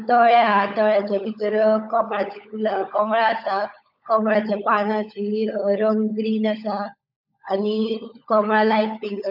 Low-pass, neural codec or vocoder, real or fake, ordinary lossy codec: 5.4 kHz; codec, 16 kHz, 16 kbps, FunCodec, trained on LibriTTS, 50 frames a second; fake; none